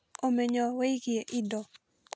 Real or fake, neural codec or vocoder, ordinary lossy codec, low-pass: real; none; none; none